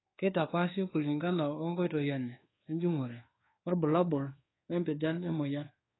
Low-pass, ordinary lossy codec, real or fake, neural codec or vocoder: 7.2 kHz; AAC, 16 kbps; fake; codec, 24 kHz, 1.2 kbps, DualCodec